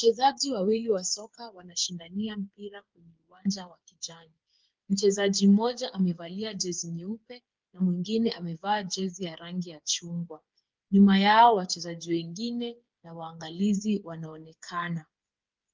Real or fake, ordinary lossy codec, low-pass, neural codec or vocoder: fake; Opus, 32 kbps; 7.2 kHz; codec, 16 kHz, 8 kbps, FreqCodec, smaller model